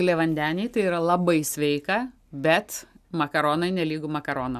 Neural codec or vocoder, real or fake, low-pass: none; real; 14.4 kHz